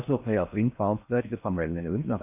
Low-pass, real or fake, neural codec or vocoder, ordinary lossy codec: 3.6 kHz; fake; codec, 16 kHz in and 24 kHz out, 0.6 kbps, FocalCodec, streaming, 4096 codes; none